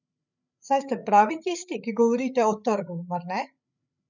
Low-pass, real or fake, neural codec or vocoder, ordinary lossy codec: 7.2 kHz; fake; codec, 16 kHz, 8 kbps, FreqCodec, larger model; none